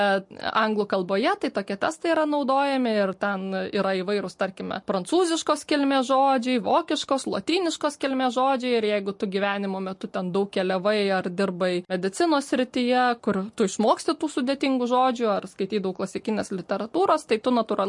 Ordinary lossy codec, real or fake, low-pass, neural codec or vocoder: MP3, 48 kbps; real; 10.8 kHz; none